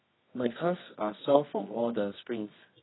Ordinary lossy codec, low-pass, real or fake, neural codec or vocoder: AAC, 16 kbps; 7.2 kHz; fake; codec, 24 kHz, 0.9 kbps, WavTokenizer, medium music audio release